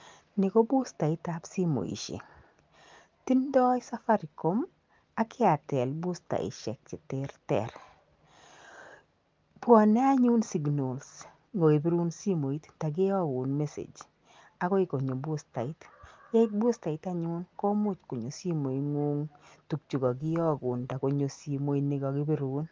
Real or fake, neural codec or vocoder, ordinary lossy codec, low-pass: real; none; Opus, 24 kbps; 7.2 kHz